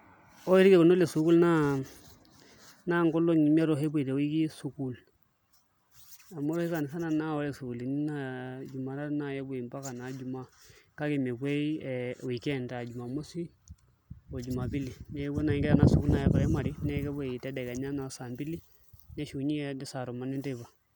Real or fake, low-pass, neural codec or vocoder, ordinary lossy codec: real; none; none; none